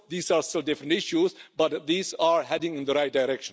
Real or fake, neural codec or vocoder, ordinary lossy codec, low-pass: real; none; none; none